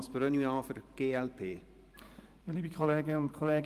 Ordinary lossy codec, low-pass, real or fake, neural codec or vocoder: Opus, 32 kbps; 14.4 kHz; fake; vocoder, 44.1 kHz, 128 mel bands every 512 samples, BigVGAN v2